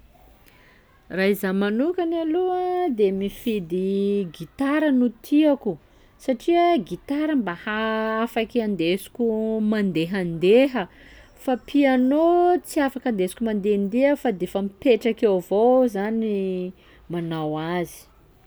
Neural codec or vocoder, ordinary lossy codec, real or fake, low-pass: none; none; real; none